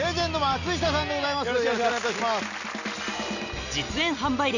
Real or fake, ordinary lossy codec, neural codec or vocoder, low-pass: real; none; none; 7.2 kHz